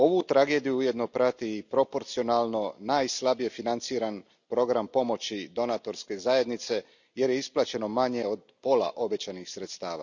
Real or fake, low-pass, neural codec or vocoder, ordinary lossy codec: real; 7.2 kHz; none; none